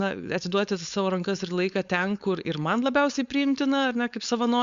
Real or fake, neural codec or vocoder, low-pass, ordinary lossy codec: fake; codec, 16 kHz, 4.8 kbps, FACodec; 7.2 kHz; Opus, 64 kbps